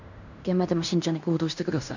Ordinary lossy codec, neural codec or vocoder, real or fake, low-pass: none; codec, 16 kHz in and 24 kHz out, 0.9 kbps, LongCat-Audio-Codec, fine tuned four codebook decoder; fake; 7.2 kHz